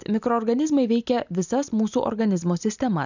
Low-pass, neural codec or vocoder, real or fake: 7.2 kHz; none; real